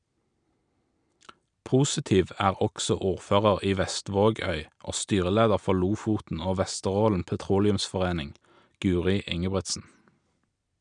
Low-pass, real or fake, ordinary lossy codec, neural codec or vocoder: 9.9 kHz; real; none; none